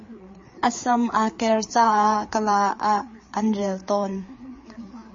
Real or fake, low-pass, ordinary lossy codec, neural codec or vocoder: fake; 7.2 kHz; MP3, 32 kbps; codec, 16 kHz, 4 kbps, FreqCodec, larger model